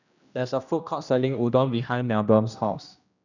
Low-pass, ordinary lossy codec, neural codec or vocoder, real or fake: 7.2 kHz; none; codec, 16 kHz, 1 kbps, X-Codec, HuBERT features, trained on general audio; fake